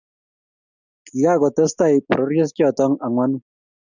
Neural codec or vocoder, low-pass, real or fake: none; 7.2 kHz; real